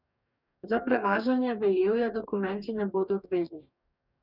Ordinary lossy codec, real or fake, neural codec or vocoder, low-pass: none; fake; codec, 44.1 kHz, 2.6 kbps, DAC; 5.4 kHz